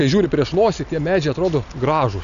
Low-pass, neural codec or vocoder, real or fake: 7.2 kHz; none; real